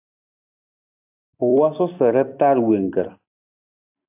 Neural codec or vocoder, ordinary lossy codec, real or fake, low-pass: vocoder, 44.1 kHz, 128 mel bands every 256 samples, BigVGAN v2; AAC, 32 kbps; fake; 3.6 kHz